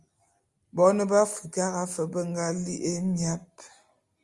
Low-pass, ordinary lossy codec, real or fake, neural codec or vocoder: 10.8 kHz; Opus, 32 kbps; real; none